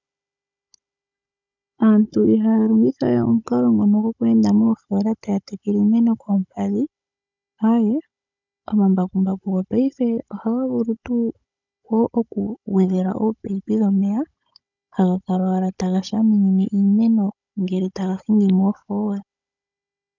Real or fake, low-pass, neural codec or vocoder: fake; 7.2 kHz; codec, 16 kHz, 16 kbps, FunCodec, trained on Chinese and English, 50 frames a second